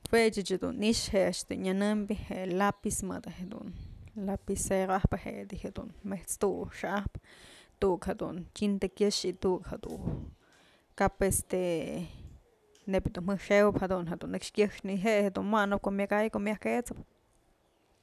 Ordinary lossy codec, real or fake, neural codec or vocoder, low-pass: none; real; none; 14.4 kHz